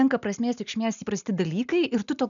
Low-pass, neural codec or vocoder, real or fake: 7.2 kHz; none; real